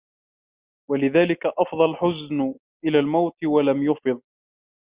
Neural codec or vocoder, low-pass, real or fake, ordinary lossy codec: none; 3.6 kHz; real; Opus, 64 kbps